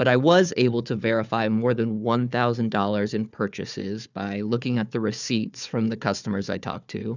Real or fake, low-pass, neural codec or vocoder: fake; 7.2 kHz; codec, 16 kHz, 4 kbps, FunCodec, trained on Chinese and English, 50 frames a second